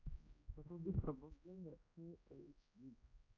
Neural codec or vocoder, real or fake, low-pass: codec, 16 kHz, 0.5 kbps, X-Codec, HuBERT features, trained on general audio; fake; 7.2 kHz